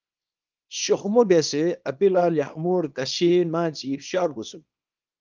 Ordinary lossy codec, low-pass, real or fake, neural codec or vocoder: Opus, 24 kbps; 7.2 kHz; fake; codec, 24 kHz, 0.9 kbps, WavTokenizer, small release